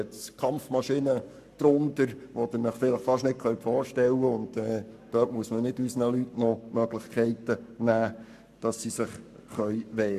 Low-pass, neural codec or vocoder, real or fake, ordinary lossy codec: 14.4 kHz; codec, 44.1 kHz, 7.8 kbps, Pupu-Codec; fake; none